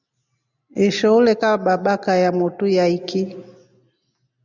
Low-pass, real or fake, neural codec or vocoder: 7.2 kHz; real; none